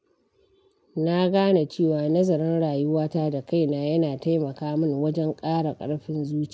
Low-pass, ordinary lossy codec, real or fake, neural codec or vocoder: none; none; real; none